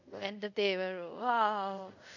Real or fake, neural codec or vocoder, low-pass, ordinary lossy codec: fake; codec, 24 kHz, 0.5 kbps, DualCodec; 7.2 kHz; none